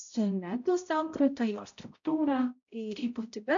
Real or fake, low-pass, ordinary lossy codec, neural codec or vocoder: fake; 7.2 kHz; AAC, 64 kbps; codec, 16 kHz, 0.5 kbps, X-Codec, HuBERT features, trained on balanced general audio